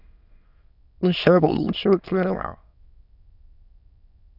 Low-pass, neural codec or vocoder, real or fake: 5.4 kHz; autoencoder, 22.05 kHz, a latent of 192 numbers a frame, VITS, trained on many speakers; fake